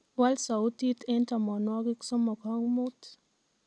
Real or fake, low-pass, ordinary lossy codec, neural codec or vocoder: real; none; none; none